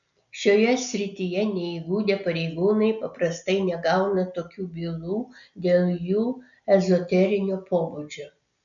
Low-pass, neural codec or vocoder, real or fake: 7.2 kHz; none; real